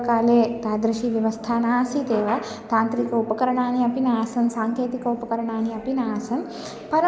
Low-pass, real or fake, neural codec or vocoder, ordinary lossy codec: none; real; none; none